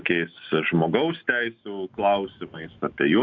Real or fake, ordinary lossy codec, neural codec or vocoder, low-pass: fake; Opus, 64 kbps; autoencoder, 48 kHz, 128 numbers a frame, DAC-VAE, trained on Japanese speech; 7.2 kHz